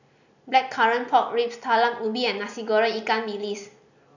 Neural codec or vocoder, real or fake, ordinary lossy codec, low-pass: none; real; none; 7.2 kHz